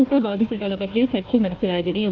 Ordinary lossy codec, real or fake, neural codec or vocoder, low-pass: Opus, 24 kbps; fake; codec, 16 kHz, 1 kbps, FunCodec, trained on Chinese and English, 50 frames a second; 7.2 kHz